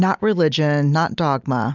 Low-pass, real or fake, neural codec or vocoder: 7.2 kHz; real; none